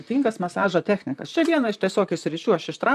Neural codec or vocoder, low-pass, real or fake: vocoder, 44.1 kHz, 128 mel bands, Pupu-Vocoder; 14.4 kHz; fake